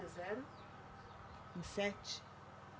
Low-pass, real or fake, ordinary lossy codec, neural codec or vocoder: none; real; none; none